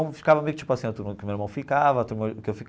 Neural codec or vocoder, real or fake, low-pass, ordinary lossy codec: none; real; none; none